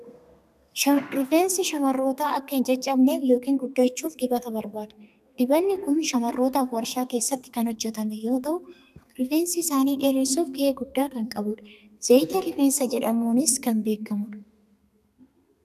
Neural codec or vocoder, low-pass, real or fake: codec, 32 kHz, 1.9 kbps, SNAC; 14.4 kHz; fake